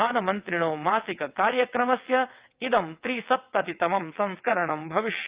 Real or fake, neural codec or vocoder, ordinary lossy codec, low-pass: fake; vocoder, 22.05 kHz, 80 mel bands, WaveNeXt; Opus, 24 kbps; 3.6 kHz